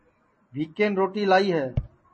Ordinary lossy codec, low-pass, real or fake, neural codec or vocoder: MP3, 32 kbps; 10.8 kHz; real; none